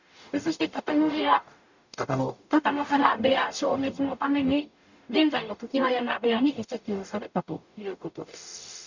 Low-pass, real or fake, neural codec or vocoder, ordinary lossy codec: 7.2 kHz; fake; codec, 44.1 kHz, 0.9 kbps, DAC; none